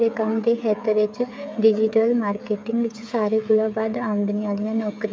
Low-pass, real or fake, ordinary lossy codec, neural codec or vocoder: none; fake; none; codec, 16 kHz, 16 kbps, FreqCodec, smaller model